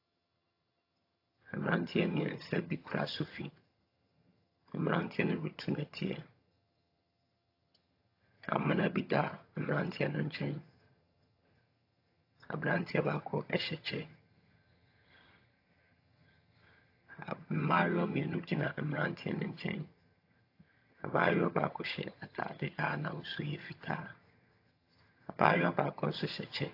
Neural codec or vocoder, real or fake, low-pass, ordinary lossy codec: vocoder, 22.05 kHz, 80 mel bands, HiFi-GAN; fake; 5.4 kHz; AAC, 32 kbps